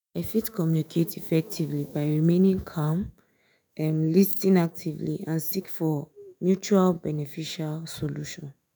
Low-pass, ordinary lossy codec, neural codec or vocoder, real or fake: none; none; autoencoder, 48 kHz, 128 numbers a frame, DAC-VAE, trained on Japanese speech; fake